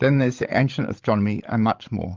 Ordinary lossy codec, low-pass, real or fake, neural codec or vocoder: Opus, 24 kbps; 7.2 kHz; fake; codec, 16 kHz, 16 kbps, FreqCodec, larger model